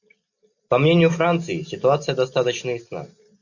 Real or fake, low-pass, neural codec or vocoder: real; 7.2 kHz; none